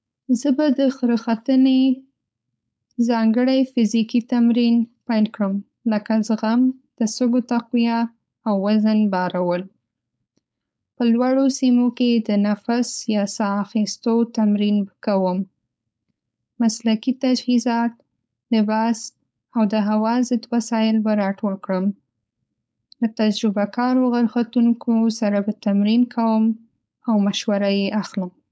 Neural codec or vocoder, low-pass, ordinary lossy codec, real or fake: codec, 16 kHz, 4.8 kbps, FACodec; none; none; fake